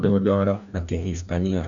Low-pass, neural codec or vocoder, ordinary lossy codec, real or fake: 7.2 kHz; codec, 16 kHz, 1 kbps, FreqCodec, larger model; none; fake